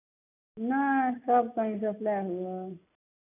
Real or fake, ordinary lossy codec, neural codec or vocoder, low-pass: real; none; none; 3.6 kHz